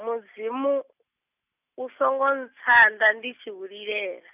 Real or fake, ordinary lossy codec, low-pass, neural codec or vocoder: real; none; 3.6 kHz; none